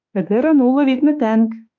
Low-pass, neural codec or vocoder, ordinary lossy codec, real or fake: 7.2 kHz; autoencoder, 48 kHz, 32 numbers a frame, DAC-VAE, trained on Japanese speech; MP3, 48 kbps; fake